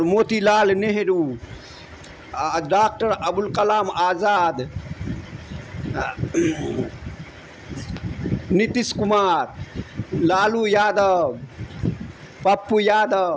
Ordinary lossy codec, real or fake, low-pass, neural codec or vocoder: none; real; none; none